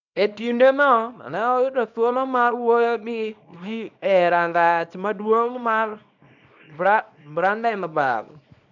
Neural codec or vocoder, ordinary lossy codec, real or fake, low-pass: codec, 24 kHz, 0.9 kbps, WavTokenizer, small release; none; fake; 7.2 kHz